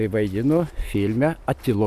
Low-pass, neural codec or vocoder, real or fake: 14.4 kHz; none; real